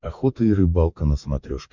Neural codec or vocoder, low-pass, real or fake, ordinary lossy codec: codec, 44.1 kHz, 7.8 kbps, DAC; 7.2 kHz; fake; Opus, 64 kbps